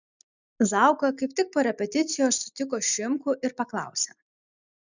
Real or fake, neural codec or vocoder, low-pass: real; none; 7.2 kHz